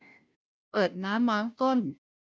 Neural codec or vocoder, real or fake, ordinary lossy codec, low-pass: codec, 16 kHz, 0.5 kbps, FunCodec, trained on Chinese and English, 25 frames a second; fake; none; none